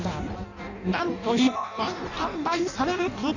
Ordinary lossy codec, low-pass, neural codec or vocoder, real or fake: none; 7.2 kHz; codec, 16 kHz in and 24 kHz out, 0.6 kbps, FireRedTTS-2 codec; fake